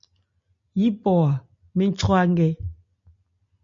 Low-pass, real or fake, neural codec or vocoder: 7.2 kHz; real; none